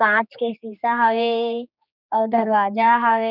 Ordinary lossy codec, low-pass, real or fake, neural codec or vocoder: none; 5.4 kHz; fake; codec, 16 kHz, 2 kbps, X-Codec, HuBERT features, trained on general audio